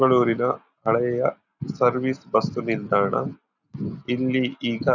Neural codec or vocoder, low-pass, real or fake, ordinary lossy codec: none; 7.2 kHz; real; none